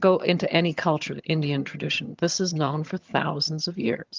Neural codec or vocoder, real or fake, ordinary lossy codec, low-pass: vocoder, 22.05 kHz, 80 mel bands, HiFi-GAN; fake; Opus, 24 kbps; 7.2 kHz